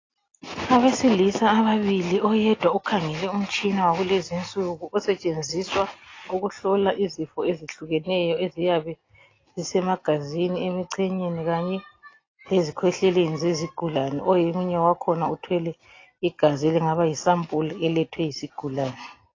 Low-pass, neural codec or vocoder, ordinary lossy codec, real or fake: 7.2 kHz; none; AAC, 32 kbps; real